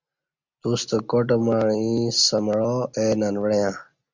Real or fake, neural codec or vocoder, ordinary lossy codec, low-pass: real; none; MP3, 64 kbps; 7.2 kHz